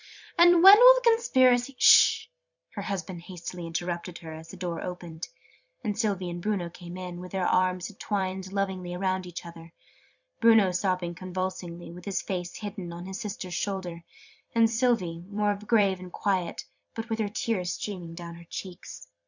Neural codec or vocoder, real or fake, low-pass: vocoder, 44.1 kHz, 128 mel bands every 256 samples, BigVGAN v2; fake; 7.2 kHz